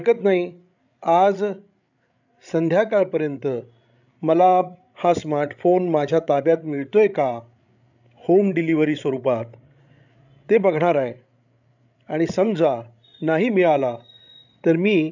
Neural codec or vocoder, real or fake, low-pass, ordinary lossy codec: codec, 16 kHz, 16 kbps, FreqCodec, larger model; fake; 7.2 kHz; none